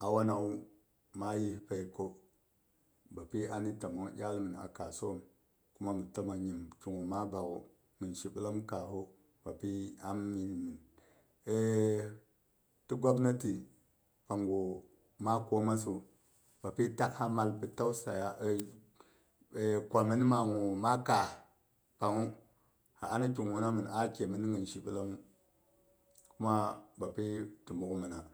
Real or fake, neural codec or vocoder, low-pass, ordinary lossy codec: fake; vocoder, 48 kHz, 128 mel bands, Vocos; none; none